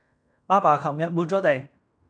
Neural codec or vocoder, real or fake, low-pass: codec, 16 kHz in and 24 kHz out, 0.9 kbps, LongCat-Audio-Codec, fine tuned four codebook decoder; fake; 9.9 kHz